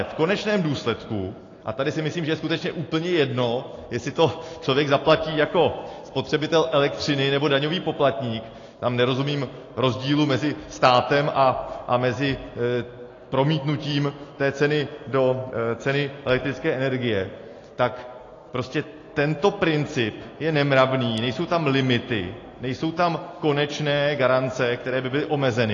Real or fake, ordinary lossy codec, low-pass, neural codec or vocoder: real; AAC, 32 kbps; 7.2 kHz; none